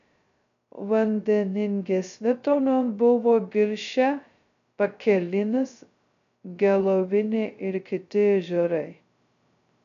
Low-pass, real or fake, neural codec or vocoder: 7.2 kHz; fake; codec, 16 kHz, 0.2 kbps, FocalCodec